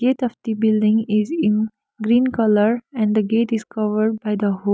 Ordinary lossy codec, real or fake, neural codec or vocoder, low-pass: none; real; none; none